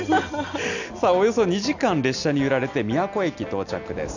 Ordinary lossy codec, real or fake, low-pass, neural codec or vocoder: none; real; 7.2 kHz; none